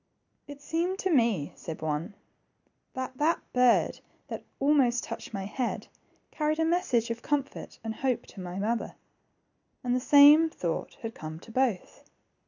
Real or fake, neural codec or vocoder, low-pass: real; none; 7.2 kHz